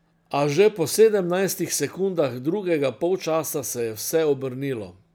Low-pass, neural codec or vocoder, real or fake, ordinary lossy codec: none; none; real; none